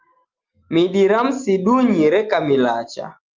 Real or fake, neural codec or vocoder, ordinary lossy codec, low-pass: real; none; Opus, 24 kbps; 7.2 kHz